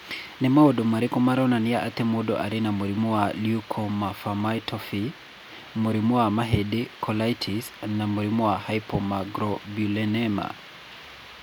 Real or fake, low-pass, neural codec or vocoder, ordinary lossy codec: real; none; none; none